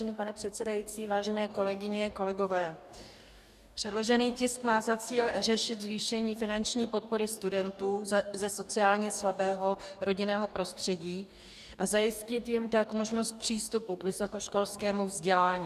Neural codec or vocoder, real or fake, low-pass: codec, 44.1 kHz, 2.6 kbps, DAC; fake; 14.4 kHz